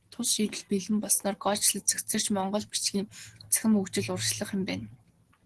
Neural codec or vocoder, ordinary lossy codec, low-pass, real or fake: none; Opus, 16 kbps; 10.8 kHz; real